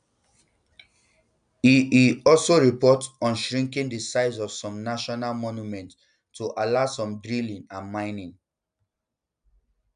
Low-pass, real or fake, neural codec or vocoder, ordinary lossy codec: 9.9 kHz; real; none; none